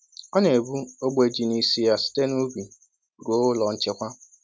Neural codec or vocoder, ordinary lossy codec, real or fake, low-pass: none; none; real; none